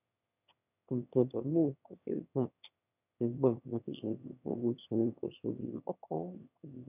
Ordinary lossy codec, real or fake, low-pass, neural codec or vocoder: none; fake; 3.6 kHz; autoencoder, 22.05 kHz, a latent of 192 numbers a frame, VITS, trained on one speaker